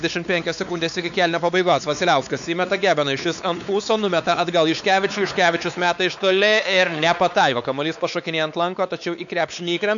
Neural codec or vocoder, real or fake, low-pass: codec, 16 kHz, 4 kbps, X-Codec, WavLM features, trained on Multilingual LibriSpeech; fake; 7.2 kHz